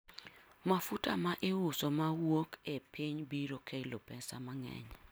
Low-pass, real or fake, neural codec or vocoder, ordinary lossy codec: none; real; none; none